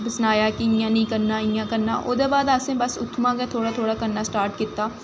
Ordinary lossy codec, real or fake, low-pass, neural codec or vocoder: none; real; none; none